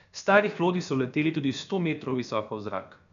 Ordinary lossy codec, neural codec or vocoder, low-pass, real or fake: none; codec, 16 kHz, about 1 kbps, DyCAST, with the encoder's durations; 7.2 kHz; fake